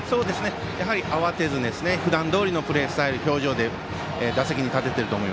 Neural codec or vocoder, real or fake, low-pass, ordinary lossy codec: none; real; none; none